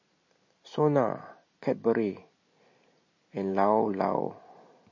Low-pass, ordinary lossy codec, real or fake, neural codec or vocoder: 7.2 kHz; MP3, 32 kbps; real; none